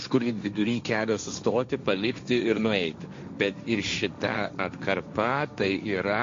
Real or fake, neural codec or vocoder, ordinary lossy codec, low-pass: fake; codec, 16 kHz, 1.1 kbps, Voila-Tokenizer; MP3, 48 kbps; 7.2 kHz